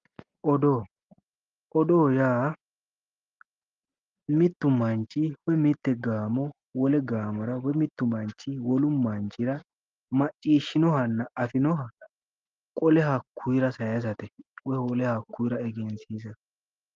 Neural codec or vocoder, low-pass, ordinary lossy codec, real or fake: none; 7.2 kHz; Opus, 32 kbps; real